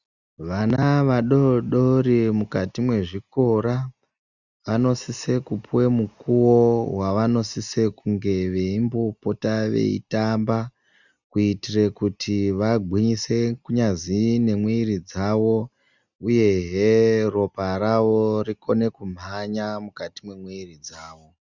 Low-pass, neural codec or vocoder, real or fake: 7.2 kHz; none; real